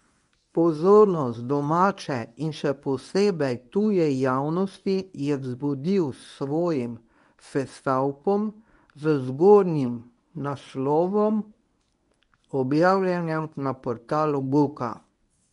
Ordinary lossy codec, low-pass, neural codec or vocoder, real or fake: none; 10.8 kHz; codec, 24 kHz, 0.9 kbps, WavTokenizer, medium speech release version 1; fake